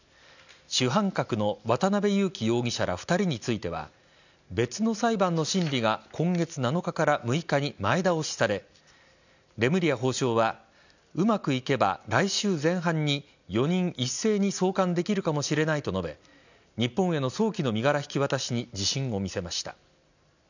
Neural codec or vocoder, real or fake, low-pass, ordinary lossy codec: none; real; 7.2 kHz; none